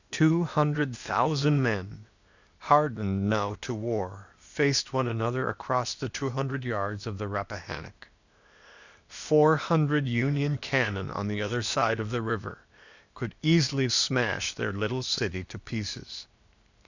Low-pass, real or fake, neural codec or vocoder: 7.2 kHz; fake; codec, 16 kHz, 0.8 kbps, ZipCodec